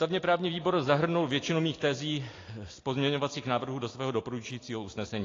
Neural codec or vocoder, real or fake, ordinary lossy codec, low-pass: none; real; AAC, 32 kbps; 7.2 kHz